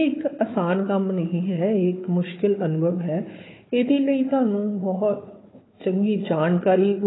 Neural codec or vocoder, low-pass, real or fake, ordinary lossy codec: codec, 16 kHz, 4 kbps, FunCodec, trained on Chinese and English, 50 frames a second; 7.2 kHz; fake; AAC, 16 kbps